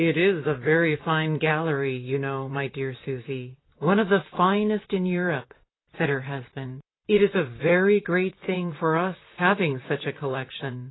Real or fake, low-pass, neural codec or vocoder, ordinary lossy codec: fake; 7.2 kHz; vocoder, 44.1 kHz, 128 mel bands, Pupu-Vocoder; AAC, 16 kbps